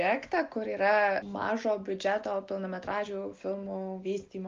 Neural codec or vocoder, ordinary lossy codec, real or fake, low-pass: none; Opus, 32 kbps; real; 7.2 kHz